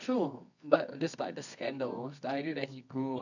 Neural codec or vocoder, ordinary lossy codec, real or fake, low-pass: codec, 24 kHz, 0.9 kbps, WavTokenizer, medium music audio release; none; fake; 7.2 kHz